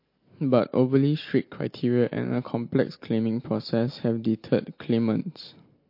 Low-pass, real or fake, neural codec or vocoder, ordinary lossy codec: 5.4 kHz; real; none; MP3, 32 kbps